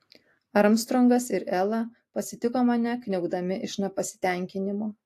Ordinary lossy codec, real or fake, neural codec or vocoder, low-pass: AAC, 64 kbps; real; none; 14.4 kHz